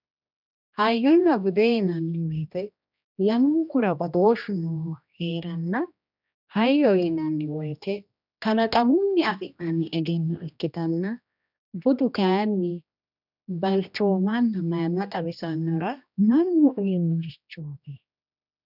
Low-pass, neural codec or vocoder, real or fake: 5.4 kHz; codec, 16 kHz, 1 kbps, X-Codec, HuBERT features, trained on general audio; fake